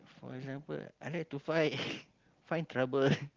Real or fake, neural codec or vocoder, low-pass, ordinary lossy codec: real; none; 7.2 kHz; Opus, 32 kbps